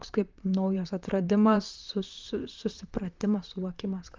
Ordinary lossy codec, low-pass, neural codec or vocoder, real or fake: Opus, 24 kbps; 7.2 kHz; vocoder, 44.1 kHz, 128 mel bands, Pupu-Vocoder; fake